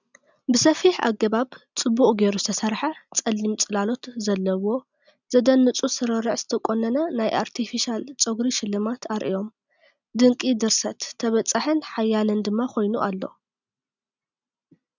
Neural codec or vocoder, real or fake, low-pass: none; real; 7.2 kHz